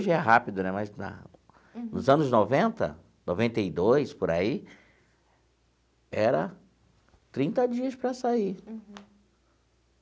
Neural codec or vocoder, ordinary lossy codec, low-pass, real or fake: none; none; none; real